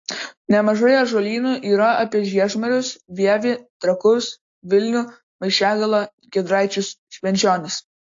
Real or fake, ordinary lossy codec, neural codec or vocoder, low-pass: real; AAC, 48 kbps; none; 7.2 kHz